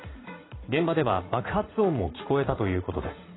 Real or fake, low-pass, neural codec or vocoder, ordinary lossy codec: fake; 7.2 kHz; vocoder, 44.1 kHz, 80 mel bands, Vocos; AAC, 16 kbps